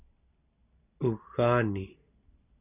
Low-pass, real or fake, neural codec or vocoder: 3.6 kHz; real; none